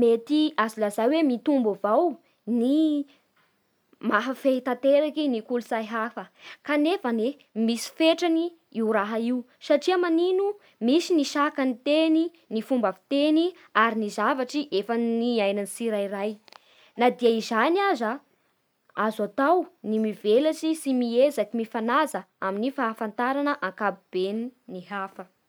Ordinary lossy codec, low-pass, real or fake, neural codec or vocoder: none; none; real; none